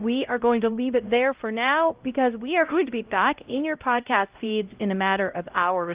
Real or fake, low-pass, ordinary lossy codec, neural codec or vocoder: fake; 3.6 kHz; Opus, 32 kbps; codec, 16 kHz, 0.5 kbps, X-Codec, HuBERT features, trained on LibriSpeech